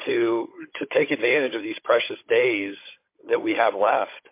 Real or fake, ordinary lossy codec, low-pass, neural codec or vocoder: fake; MP3, 24 kbps; 3.6 kHz; codec, 16 kHz, 16 kbps, FunCodec, trained on Chinese and English, 50 frames a second